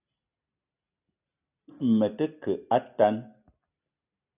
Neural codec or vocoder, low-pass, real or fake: none; 3.6 kHz; real